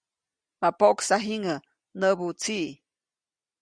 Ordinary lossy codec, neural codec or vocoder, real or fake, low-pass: Opus, 64 kbps; none; real; 9.9 kHz